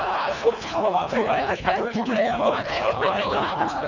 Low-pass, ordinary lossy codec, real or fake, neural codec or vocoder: 7.2 kHz; none; fake; codec, 24 kHz, 1.5 kbps, HILCodec